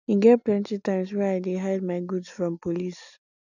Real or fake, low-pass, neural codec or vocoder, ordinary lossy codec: real; 7.2 kHz; none; none